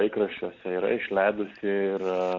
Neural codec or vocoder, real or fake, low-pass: none; real; 7.2 kHz